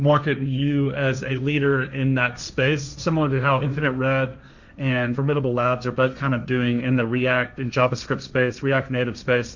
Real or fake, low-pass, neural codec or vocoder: fake; 7.2 kHz; codec, 16 kHz, 1.1 kbps, Voila-Tokenizer